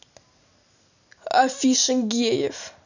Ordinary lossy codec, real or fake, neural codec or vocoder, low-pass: none; real; none; 7.2 kHz